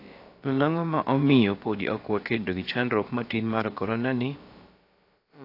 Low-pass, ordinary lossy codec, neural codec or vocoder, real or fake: 5.4 kHz; AAC, 32 kbps; codec, 16 kHz, about 1 kbps, DyCAST, with the encoder's durations; fake